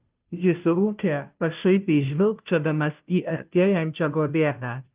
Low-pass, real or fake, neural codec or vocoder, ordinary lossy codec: 3.6 kHz; fake; codec, 16 kHz, 0.5 kbps, FunCodec, trained on Chinese and English, 25 frames a second; Opus, 32 kbps